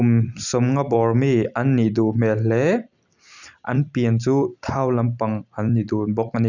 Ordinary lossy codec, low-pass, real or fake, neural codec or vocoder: none; 7.2 kHz; fake; vocoder, 44.1 kHz, 128 mel bands every 256 samples, BigVGAN v2